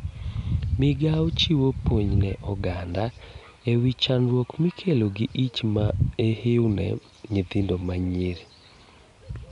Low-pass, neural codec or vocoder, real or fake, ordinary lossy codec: 10.8 kHz; none; real; none